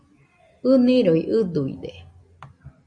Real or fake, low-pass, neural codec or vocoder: real; 9.9 kHz; none